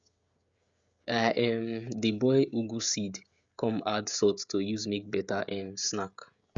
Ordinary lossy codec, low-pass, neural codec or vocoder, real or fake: Opus, 64 kbps; 7.2 kHz; codec, 16 kHz, 16 kbps, FreqCodec, smaller model; fake